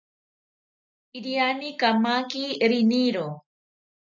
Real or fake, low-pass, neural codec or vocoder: real; 7.2 kHz; none